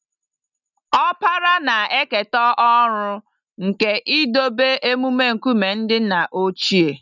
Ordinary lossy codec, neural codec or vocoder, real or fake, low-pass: none; none; real; 7.2 kHz